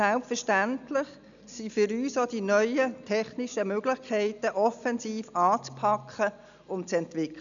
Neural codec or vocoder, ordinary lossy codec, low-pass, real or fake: none; none; 7.2 kHz; real